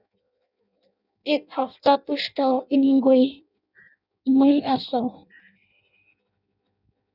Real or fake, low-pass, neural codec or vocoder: fake; 5.4 kHz; codec, 16 kHz in and 24 kHz out, 0.6 kbps, FireRedTTS-2 codec